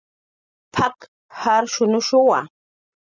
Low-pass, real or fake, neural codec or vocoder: 7.2 kHz; real; none